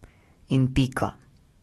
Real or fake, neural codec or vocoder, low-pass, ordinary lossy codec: fake; codec, 44.1 kHz, 7.8 kbps, DAC; 19.8 kHz; AAC, 32 kbps